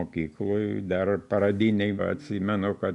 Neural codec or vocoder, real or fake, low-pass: none; real; 10.8 kHz